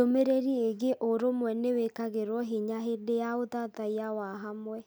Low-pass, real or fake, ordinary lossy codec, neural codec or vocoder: none; real; none; none